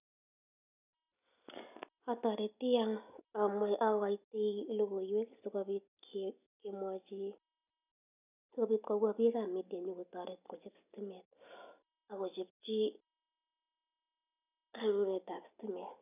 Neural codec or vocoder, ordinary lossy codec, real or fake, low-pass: none; none; real; 3.6 kHz